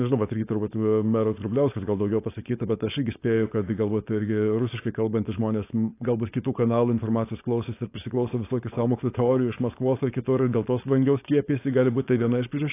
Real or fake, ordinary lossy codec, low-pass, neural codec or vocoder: fake; AAC, 24 kbps; 3.6 kHz; codec, 16 kHz, 4.8 kbps, FACodec